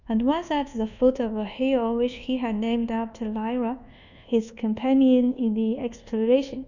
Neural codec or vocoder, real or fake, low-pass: codec, 24 kHz, 1.2 kbps, DualCodec; fake; 7.2 kHz